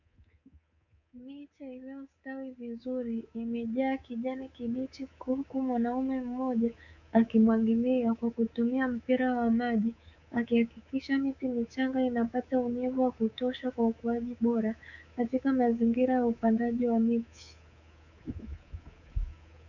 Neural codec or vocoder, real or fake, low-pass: codec, 24 kHz, 3.1 kbps, DualCodec; fake; 7.2 kHz